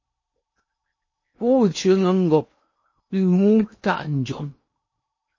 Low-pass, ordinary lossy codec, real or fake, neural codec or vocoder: 7.2 kHz; MP3, 32 kbps; fake; codec, 16 kHz in and 24 kHz out, 0.8 kbps, FocalCodec, streaming, 65536 codes